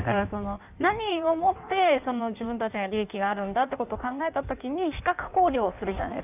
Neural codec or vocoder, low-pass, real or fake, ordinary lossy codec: codec, 16 kHz in and 24 kHz out, 1.1 kbps, FireRedTTS-2 codec; 3.6 kHz; fake; none